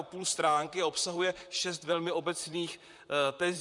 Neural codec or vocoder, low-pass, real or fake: vocoder, 44.1 kHz, 128 mel bands, Pupu-Vocoder; 10.8 kHz; fake